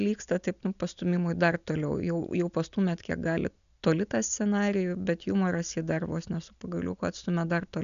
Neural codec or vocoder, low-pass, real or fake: none; 7.2 kHz; real